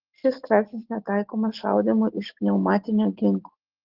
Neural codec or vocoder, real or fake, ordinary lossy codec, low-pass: none; real; Opus, 16 kbps; 5.4 kHz